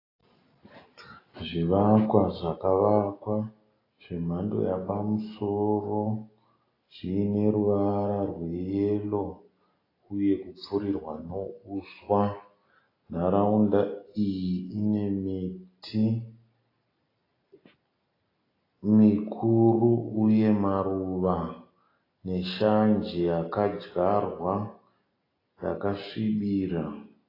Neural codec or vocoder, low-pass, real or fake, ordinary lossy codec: none; 5.4 kHz; real; AAC, 24 kbps